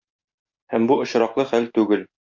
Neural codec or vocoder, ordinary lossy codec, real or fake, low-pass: none; MP3, 48 kbps; real; 7.2 kHz